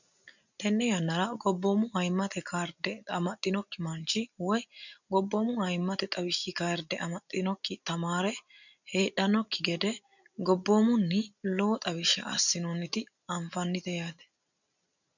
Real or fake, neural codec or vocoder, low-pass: real; none; 7.2 kHz